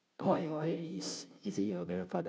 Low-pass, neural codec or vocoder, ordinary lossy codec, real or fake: none; codec, 16 kHz, 0.5 kbps, FunCodec, trained on Chinese and English, 25 frames a second; none; fake